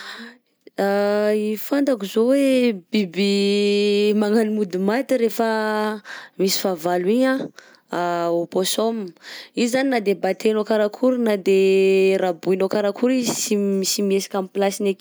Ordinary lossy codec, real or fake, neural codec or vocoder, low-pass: none; real; none; none